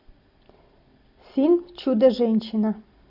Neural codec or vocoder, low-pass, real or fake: none; 5.4 kHz; real